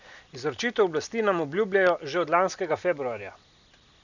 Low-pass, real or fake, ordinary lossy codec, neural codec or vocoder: 7.2 kHz; real; none; none